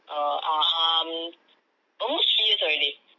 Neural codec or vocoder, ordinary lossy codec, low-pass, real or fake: none; none; 7.2 kHz; real